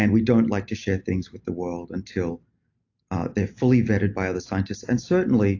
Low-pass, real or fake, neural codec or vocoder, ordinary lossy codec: 7.2 kHz; real; none; AAC, 48 kbps